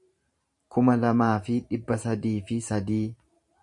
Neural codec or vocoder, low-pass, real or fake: vocoder, 44.1 kHz, 128 mel bands every 512 samples, BigVGAN v2; 10.8 kHz; fake